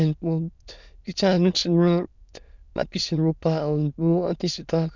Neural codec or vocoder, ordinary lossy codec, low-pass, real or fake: autoencoder, 22.05 kHz, a latent of 192 numbers a frame, VITS, trained on many speakers; none; 7.2 kHz; fake